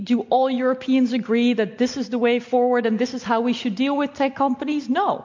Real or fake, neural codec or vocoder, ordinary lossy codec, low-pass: real; none; MP3, 48 kbps; 7.2 kHz